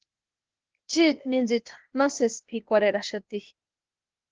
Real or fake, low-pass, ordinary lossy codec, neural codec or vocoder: fake; 7.2 kHz; Opus, 16 kbps; codec, 16 kHz, 0.8 kbps, ZipCodec